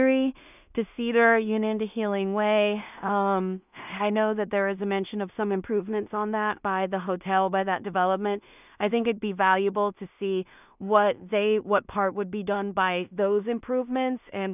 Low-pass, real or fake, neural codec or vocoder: 3.6 kHz; fake; codec, 16 kHz in and 24 kHz out, 0.4 kbps, LongCat-Audio-Codec, two codebook decoder